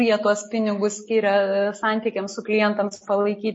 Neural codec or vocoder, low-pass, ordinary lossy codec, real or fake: none; 9.9 kHz; MP3, 32 kbps; real